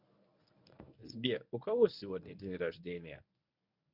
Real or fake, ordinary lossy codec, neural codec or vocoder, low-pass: fake; none; codec, 24 kHz, 0.9 kbps, WavTokenizer, medium speech release version 1; 5.4 kHz